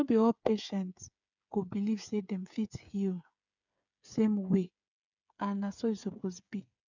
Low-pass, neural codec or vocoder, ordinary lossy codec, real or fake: 7.2 kHz; codec, 16 kHz, 8 kbps, FreqCodec, smaller model; none; fake